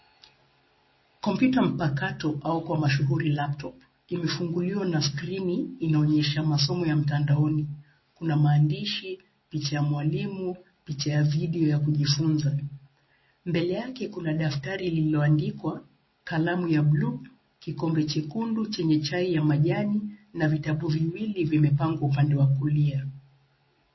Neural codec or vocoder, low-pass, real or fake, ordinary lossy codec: none; 7.2 kHz; real; MP3, 24 kbps